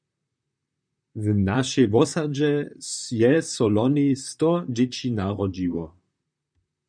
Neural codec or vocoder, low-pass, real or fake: vocoder, 44.1 kHz, 128 mel bands, Pupu-Vocoder; 9.9 kHz; fake